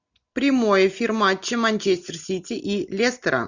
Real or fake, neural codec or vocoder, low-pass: real; none; 7.2 kHz